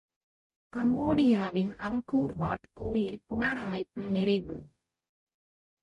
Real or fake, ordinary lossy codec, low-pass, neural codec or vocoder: fake; MP3, 48 kbps; 14.4 kHz; codec, 44.1 kHz, 0.9 kbps, DAC